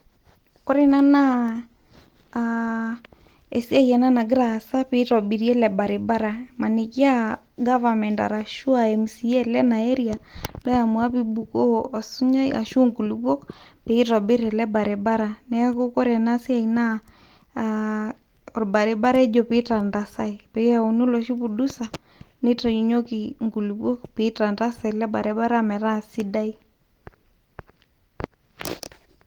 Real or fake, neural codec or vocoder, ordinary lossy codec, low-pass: real; none; Opus, 24 kbps; 19.8 kHz